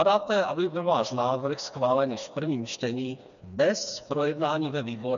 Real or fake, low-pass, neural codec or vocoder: fake; 7.2 kHz; codec, 16 kHz, 2 kbps, FreqCodec, smaller model